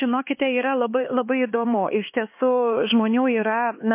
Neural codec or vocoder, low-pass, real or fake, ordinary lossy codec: codec, 16 kHz, 4 kbps, X-Codec, HuBERT features, trained on LibriSpeech; 3.6 kHz; fake; MP3, 24 kbps